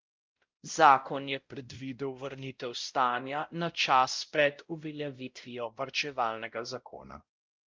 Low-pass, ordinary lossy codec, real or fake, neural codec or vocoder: 7.2 kHz; Opus, 24 kbps; fake; codec, 16 kHz, 0.5 kbps, X-Codec, WavLM features, trained on Multilingual LibriSpeech